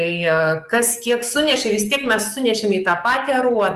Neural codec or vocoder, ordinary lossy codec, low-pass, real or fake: codec, 44.1 kHz, 7.8 kbps, DAC; Opus, 32 kbps; 14.4 kHz; fake